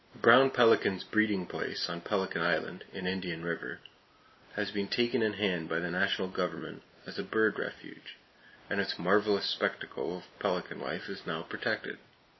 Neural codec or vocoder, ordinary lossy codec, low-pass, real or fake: none; MP3, 24 kbps; 7.2 kHz; real